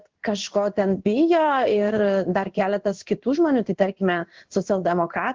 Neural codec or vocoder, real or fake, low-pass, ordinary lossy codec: codec, 16 kHz in and 24 kHz out, 1 kbps, XY-Tokenizer; fake; 7.2 kHz; Opus, 16 kbps